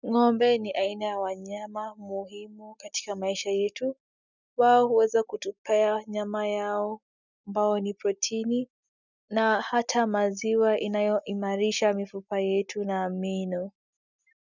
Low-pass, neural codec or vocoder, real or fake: 7.2 kHz; none; real